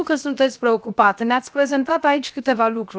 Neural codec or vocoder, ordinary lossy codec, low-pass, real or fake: codec, 16 kHz, 0.7 kbps, FocalCodec; none; none; fake